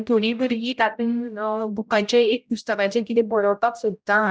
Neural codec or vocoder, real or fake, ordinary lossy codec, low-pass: codec, 16 kHz, 0.5 kbps, X-Codec, HuBERT features, trained on general audio; fake; none; none